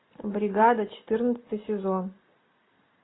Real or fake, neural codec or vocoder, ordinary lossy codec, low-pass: real; none; AAC, 16 kbps; 7.2 kHz